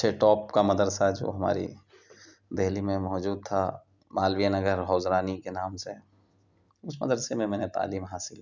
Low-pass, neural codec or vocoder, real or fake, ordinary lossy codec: 7.2 kHz; none; real; none